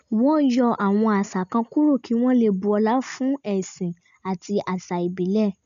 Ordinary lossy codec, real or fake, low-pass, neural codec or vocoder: none; real; 7.2 kHz; none